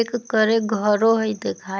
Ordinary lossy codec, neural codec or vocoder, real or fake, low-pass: none; none; real; none